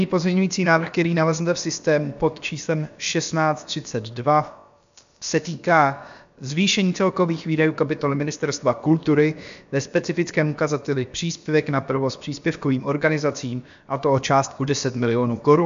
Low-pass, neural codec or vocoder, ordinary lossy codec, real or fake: 7.2 kHz; codec, 16 kHz, about 1 kbps, DyCAST, with the encoder's durations; MP3, 64 kbps; fake